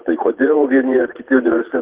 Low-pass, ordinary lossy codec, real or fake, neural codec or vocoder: 3.6 kHz; Opus, 16 kbps; fake; vocoder, 22.05 kHz, 80 mel bands, Vocos